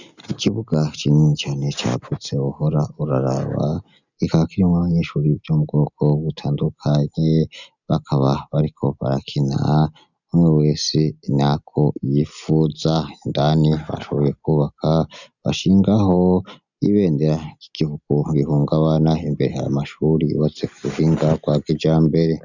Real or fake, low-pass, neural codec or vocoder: real; 7.2 kHz; none